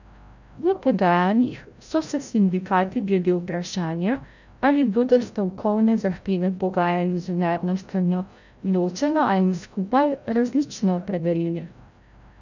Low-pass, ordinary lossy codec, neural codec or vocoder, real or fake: 7.2 kHz; none; codec, 16 kHz, 0.5 kbps, FreqCodec, larger model; fake